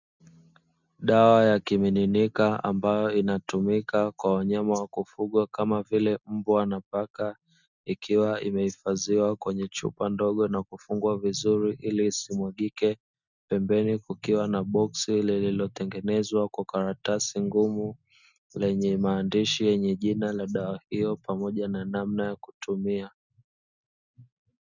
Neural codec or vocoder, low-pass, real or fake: none; 7.2 kHz; real